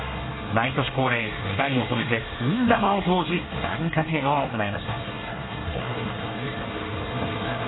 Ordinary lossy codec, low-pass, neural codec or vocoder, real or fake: AAC, 16 kbps; 7.2 kHz; codec, 24 kHz, 1 kbps, SNAC; fake